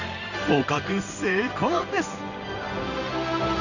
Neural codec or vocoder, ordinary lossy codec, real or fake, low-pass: codec, 16 kHz in and 24 kHz out, 1 kbps, XY-Tokenizer; none; fake; 7.2 kHz